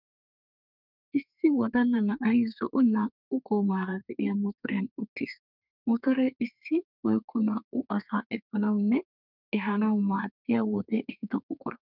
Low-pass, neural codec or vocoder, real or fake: 5.4 kHz; codec, 32 kHz, 1.9 kbps, SNAC; fake